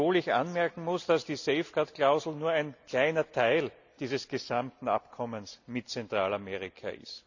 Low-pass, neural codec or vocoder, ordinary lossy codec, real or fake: 7.2 kHz; none; none; real